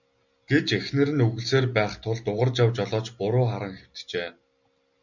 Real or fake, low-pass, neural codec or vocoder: real; 7.2 kHz; none